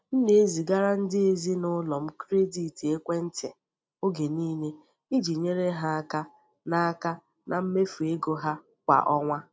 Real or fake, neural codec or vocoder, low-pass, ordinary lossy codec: real; none; none; none